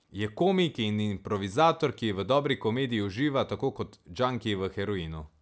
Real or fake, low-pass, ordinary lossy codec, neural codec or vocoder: real; none; none; none